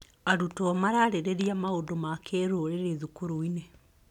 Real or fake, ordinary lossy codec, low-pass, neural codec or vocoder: real; none; 19.8 kHz; none